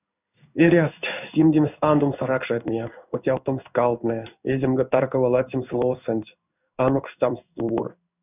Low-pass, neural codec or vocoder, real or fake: 3.6 kHz; codec, 16 kHz in and 24 kHz out, 1 kbps, XY-Tokenizer; fake